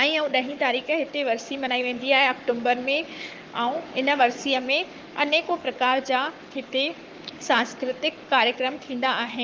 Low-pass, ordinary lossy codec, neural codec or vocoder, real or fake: 7.2 kHz; Opus, 32 kbps; codec, 44.1 kHz, 7.8 kbps, Pupu-Codec; fake